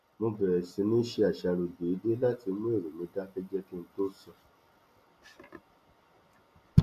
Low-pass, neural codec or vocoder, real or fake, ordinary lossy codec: 14.4 kHz; none; real; none